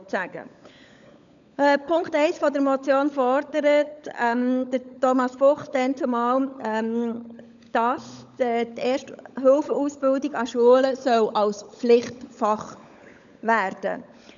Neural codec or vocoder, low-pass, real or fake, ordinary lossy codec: codec, 16 kHz, 16 kbps, FunCodec, trained on LibriTTS, 50 frames a second; 7.2 kHz; fake; none